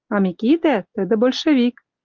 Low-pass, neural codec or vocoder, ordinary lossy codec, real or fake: 7.2 kHz; none; Opus, 32 kbps; real